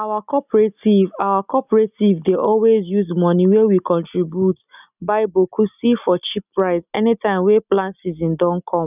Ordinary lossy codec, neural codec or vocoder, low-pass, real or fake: none; none; 3.6 kHz; real